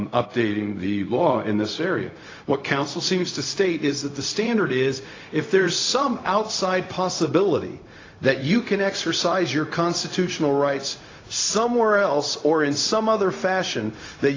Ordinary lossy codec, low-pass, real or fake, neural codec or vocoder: AAC, 32 kbps; 7.2 kHz; fake; codec, 16 kHz, 0.4 kbps, LongCat-Audio-Codec